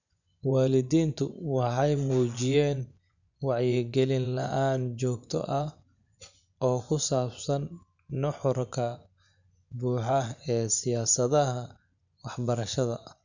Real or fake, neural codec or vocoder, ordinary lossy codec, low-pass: fake; vocoder, 24 kHz, 100 mel bands, Vocos; none; 7.2 kHz